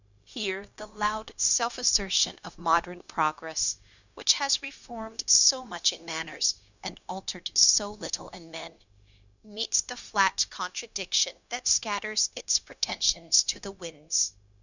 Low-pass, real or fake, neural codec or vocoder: 7.2 kHz; fake; codec, 16 kHz, 0.9 kbps, LongCat-Audio-Codec